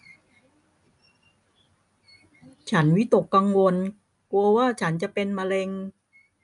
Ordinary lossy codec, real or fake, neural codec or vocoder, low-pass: none; real; none; 10.8 kHz